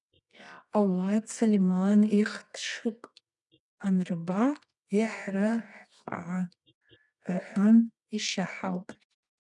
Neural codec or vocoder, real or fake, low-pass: codec, 24 kHz, 0.9 kbps, WavTokenizer, medium music audio release; fake; 10.8 kHz